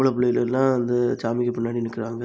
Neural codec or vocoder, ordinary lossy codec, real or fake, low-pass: none; none; real; none